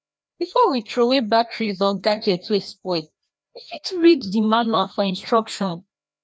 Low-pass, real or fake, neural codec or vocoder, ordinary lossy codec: none; fake; codec, 16 kHz, 1 kbps, FreqCodec, larger model; none